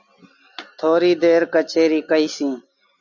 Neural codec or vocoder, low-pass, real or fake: none; 7.2 kHz; real